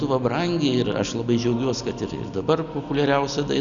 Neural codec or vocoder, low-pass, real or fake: none; 7.2 kHz; real